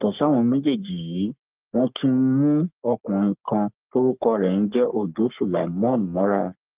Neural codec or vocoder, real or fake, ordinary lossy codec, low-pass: codec, 44.1 kHz, 3.4 kbps, Pupu-Codec; fake; Opus, 32 kbps; 3.6 kHz